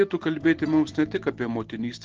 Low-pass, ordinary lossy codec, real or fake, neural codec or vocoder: 7.2 kHz; Opus, 16 kbps; real; none